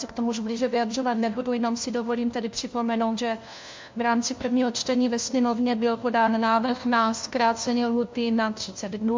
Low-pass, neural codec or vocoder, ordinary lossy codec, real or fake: 7.2 kHz; codec, 16 kHz, 1 kbps, FunCodec, trained on LibriTTS, 50 frames a second; MP3, 48 kbps; fake